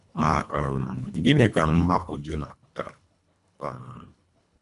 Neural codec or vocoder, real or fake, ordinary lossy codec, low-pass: codec, 24 kHz, 1.5 kbps, HILCodec; fake; none; 10.8 kHz